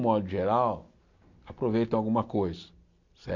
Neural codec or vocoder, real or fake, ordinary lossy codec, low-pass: none; real; MP3, 48 kbps; 7.2 kHz